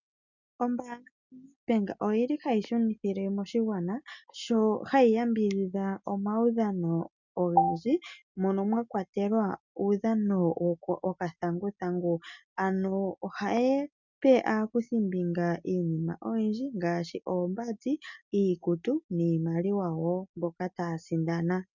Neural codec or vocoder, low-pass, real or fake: none; 7.2 kHz; real